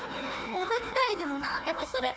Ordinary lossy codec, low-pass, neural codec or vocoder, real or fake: none; none; codec, 16 kHz, 1 kbps, FunCodec, trained on Chinese and English, 50 frames a second; fake